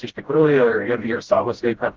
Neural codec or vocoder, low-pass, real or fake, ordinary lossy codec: codec, 16 kHz, 0.5 kbps, FreqCodec, smaller model; 7.2 kHz; fake; Opus, 16 kbps